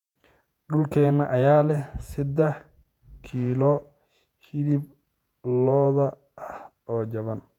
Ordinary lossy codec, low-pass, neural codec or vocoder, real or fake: none; 19.8 kHz; vocoder, 48 kHz, 128 mel bands, Vocos; fake